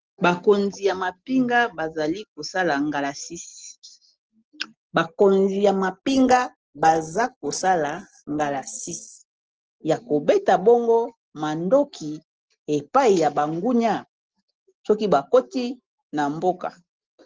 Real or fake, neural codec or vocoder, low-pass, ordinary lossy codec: real; none; 7.2 kHz; Opus, 16 kbps